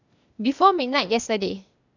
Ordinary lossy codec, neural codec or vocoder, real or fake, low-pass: none; codec, 16 kHz, 0.8 kbps, ZipCodec; fake; 7.2 kHz